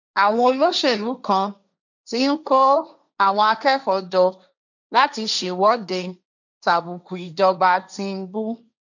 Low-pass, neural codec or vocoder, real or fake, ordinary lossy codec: 7.2 kHz; codec, 16 kHz, 1.1 kbps, Voila-Tokenizer; fake; none